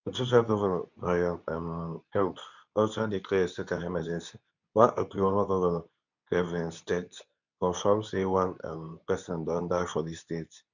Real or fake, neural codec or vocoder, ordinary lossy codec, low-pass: fake; codec, 24 kHz, 0.9 kbps, WavTokenizer, medium speech release version 2; none; 7.2 kHz